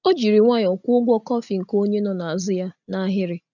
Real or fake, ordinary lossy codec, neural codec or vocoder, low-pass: real; none; none; 7.2 kHz